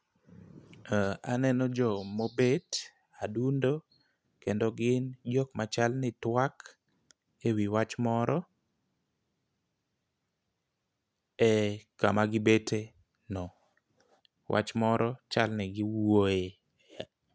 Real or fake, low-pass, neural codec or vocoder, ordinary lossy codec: real; none; none; none